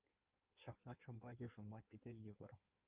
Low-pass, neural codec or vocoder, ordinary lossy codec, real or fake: 3.6 kHz; codec, 16 kHz in and 24 kHz out, 1.1 kbps, FireRedTTS-2 codec; Opus, 24 kbps; fake